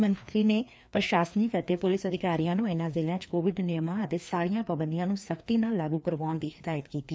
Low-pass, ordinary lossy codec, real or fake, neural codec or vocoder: none; none; fake; codec, 16 kHz, 2 kbps, FreqCodec, larger model